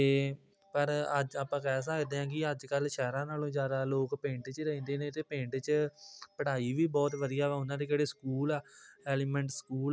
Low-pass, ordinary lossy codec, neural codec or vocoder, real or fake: none; none; none; real